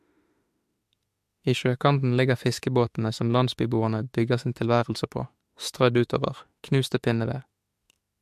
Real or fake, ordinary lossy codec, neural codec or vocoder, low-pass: fake; MP3, 64 kbps; autoencoder, 48 kHz, 32 numbers a frame, DAC-VAE, trained on Japanese speech; 14.4 kHz